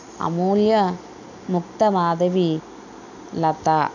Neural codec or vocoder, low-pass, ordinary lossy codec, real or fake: none; 7.2 kHz; none; real